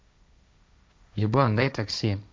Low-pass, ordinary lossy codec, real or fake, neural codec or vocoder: none; none; fake; codec, 16 kHz, 1.1 kbps, Voila-Tokenizer